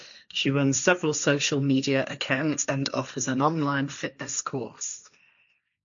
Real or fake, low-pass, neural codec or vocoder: fake; 7.2 kHz; codec, 16 kHz, 1.1 kbps, Voila-Tokenizer